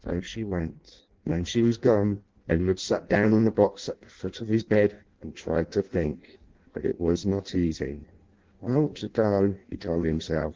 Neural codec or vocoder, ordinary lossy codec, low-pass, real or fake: codec, 16 kHz in and 24 kHz out, 0.6 kbps, FireRedTTS-2 codec; Opus, 16 kbps; 7.2 kHz; fake